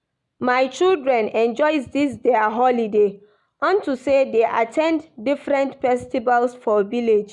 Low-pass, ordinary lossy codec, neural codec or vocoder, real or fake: 10.8 kHz; none; none; real